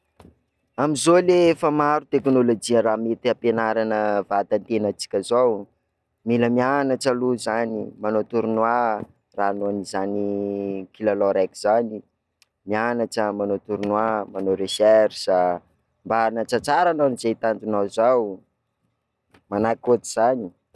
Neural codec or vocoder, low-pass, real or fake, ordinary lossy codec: none; none; real; none